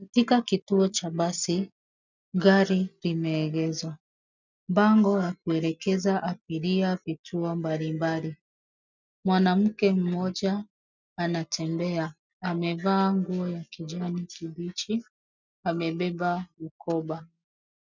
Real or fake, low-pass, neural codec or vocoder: real; 7.2 kHz; none